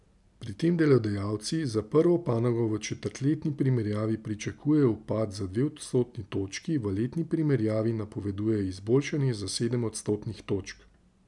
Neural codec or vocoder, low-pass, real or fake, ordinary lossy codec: none; 10.8 kHz; real; none